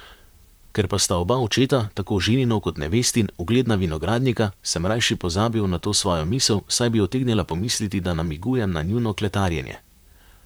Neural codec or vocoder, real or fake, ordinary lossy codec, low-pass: vocoder, 44.1 kHz, 128 mel bands, Pupu-Vocoder; fake; none; none